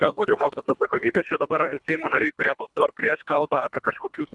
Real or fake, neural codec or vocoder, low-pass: fake; codec, 24 kHz, 1.5 kbps, HILCodec; 10.8 kHz